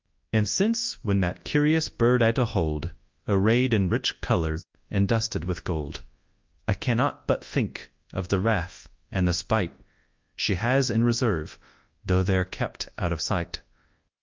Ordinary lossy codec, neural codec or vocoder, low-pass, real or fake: Opus, 32 kbps; codec, 24 kHz, 0.9 kbps, WavTokenizer, large speech release; 7.2 kHz; fake